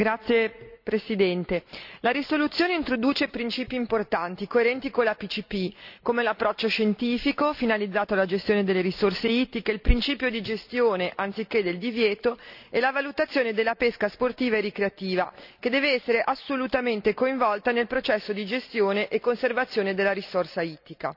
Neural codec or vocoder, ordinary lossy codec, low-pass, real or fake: none; none; 5.4 kHz; real